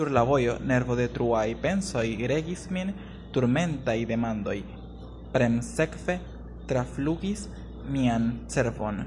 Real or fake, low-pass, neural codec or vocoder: real; 10.8 kHz; none